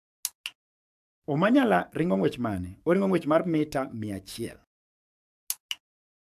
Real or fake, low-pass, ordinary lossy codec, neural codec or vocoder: fake; 14.4 kHz; AAC, 96 kbps; codec, 44.1 kHz, 7.8 kbps, DAC